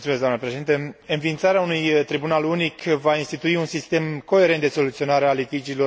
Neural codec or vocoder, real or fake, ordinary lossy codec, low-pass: none; real; none; none